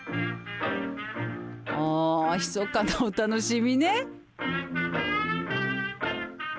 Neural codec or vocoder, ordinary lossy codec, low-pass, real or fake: none; none; none; real